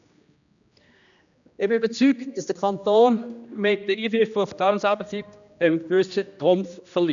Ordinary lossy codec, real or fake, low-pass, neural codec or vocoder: none; fake; 7.2 kHz; codec, 16 kHz, 1 kbps, X-Codec, HuBERT features, trained on general audio